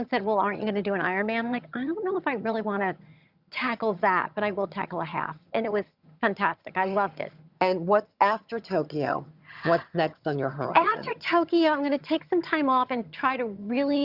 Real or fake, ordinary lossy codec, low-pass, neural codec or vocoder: fake; Opus, 64 kbps; 5.4 kHz; vocoder, 22.05 kHz, 80 mel bands, HiFi-GAN